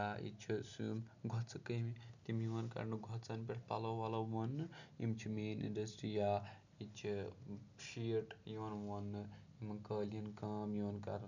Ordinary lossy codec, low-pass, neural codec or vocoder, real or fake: none; 7.2 kHz; none; real